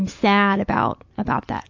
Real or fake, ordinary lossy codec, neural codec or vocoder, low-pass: real; AAC, 48 kbps; none; 7.2 kHz